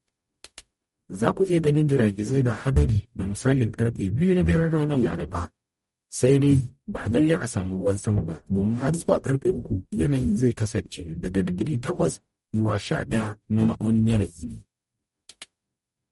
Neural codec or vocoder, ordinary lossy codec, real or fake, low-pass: codec, 44.1 kHz, 0.9 kbps, DAC; MP3, 48 kbps; fake; 19.8 kHz